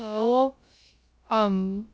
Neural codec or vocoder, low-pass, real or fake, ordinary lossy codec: codec, 16 kHz, about 1 kbps, DyCAST, with the encoder's durations; none; fake; none